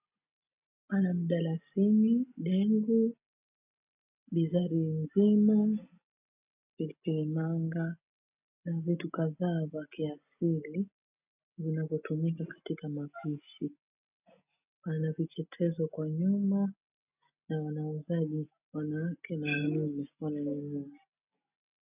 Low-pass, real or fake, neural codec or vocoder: 3.6 kHz; real; none